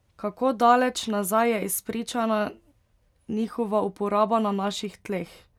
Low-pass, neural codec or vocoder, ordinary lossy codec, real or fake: 19.8 kHz; none; none; real